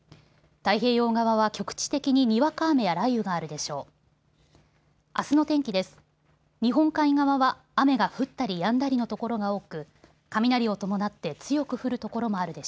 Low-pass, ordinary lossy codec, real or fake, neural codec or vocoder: none; none; real; none